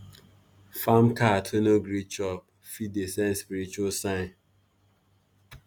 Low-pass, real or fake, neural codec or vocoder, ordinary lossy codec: none; real; none; none